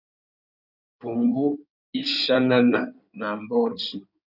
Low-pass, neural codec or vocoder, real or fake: 5.4 kHz; codec, 16 kHz in and 24 kHz out, 2.2 kbps, FireRedTTS-2 codec; fake